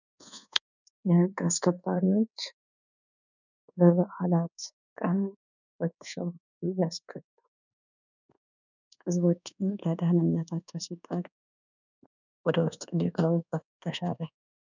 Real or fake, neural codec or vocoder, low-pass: fake; codec, 24 kHz, 1.2 kbps, DualCodec; 7.2 kHz